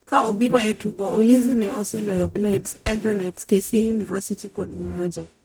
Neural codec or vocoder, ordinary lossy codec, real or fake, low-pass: codec, 44.1 kHz, 0.9 kbps, DAC; none; fake; none